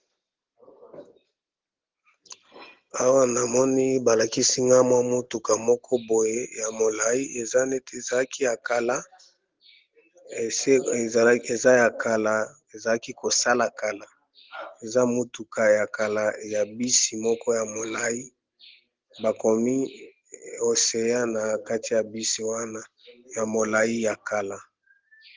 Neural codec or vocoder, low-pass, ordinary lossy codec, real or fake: none; 7.2 kHz; Opus, 16 kbps; real